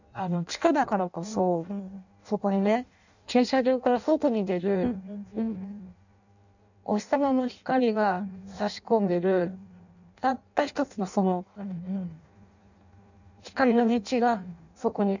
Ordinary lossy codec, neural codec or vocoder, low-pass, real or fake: none; codec, 16 kHz in and 24 kHz out, 0.6 kbps, FireRedTTS-2 codec; 7.2 kHz; fake